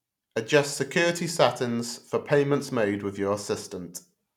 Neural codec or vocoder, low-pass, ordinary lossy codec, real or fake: none; 19.8 kHz; none; real